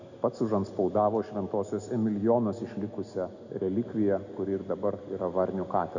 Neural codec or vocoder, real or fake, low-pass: none; real; 7.2 kHz